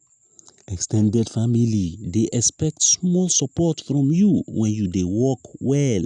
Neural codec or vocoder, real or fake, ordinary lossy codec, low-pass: none; real; none; 9.9 kHz